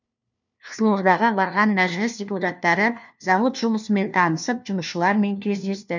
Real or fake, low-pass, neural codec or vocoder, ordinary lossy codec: fake; 7.2 kHz; codec, 16 kHz, 1 kbps, FunCodec, trained on LibriTTS, 50 frames a second; none